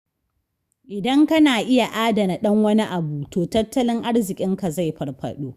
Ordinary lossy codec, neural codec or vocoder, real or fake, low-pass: Opus, 64 kbps; autoencoder, 48 kHz, 128 numbers a frame, DAC-VAE, trained on Japanese speech; fake; 14.4 kHz